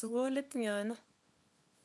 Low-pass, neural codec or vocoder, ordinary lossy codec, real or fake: none; codec, 24 kHz, 0.9 kbps, WavTokenizer, small release; none; fake